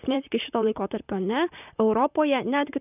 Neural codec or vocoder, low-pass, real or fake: vocoder, 44.1 kHz, 128 mel bands, Pupu-Vocoder; 3.6 kHz; fake